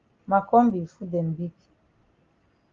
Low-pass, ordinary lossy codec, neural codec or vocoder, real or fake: 7.2 kHz; Opus, 32 kbps; none; real